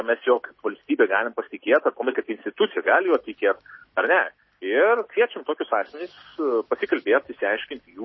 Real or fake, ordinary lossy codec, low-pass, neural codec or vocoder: real; MP3, 24 kbps; 7.2 kHz; none